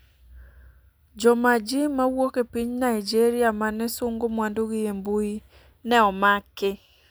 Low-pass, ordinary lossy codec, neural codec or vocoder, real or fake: none; none; none; real